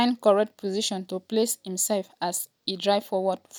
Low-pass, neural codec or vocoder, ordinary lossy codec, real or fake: none; none; none; real